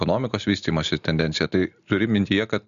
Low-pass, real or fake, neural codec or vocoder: 7.2 kHz; real; none